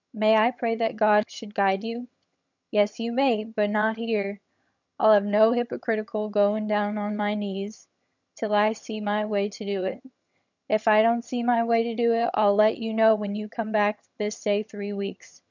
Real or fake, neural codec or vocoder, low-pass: fake; vocoder, 22.05 kHz, 80 mel bands, HiFi-GAN; 7.2 kHz